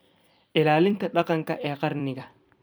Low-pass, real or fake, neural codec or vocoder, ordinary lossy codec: none; fake; vocoder, 44.1 kHz, 128 mel bands every 256 samples, BigVGAN v2; none